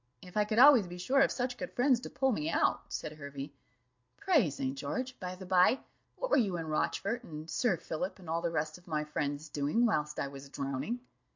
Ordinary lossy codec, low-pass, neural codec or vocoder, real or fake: MP3, 48 kbps; 7.2 kHz; none; real